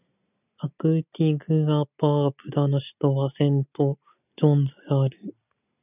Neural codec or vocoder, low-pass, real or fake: none; 3.6 kHz; real